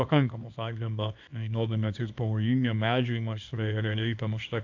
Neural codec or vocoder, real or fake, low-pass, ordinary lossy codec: codec, 24 kHz, 0.9 kbps, WavTokenizer, small release; fake; 7.2 kHz; AAC, 48 kbps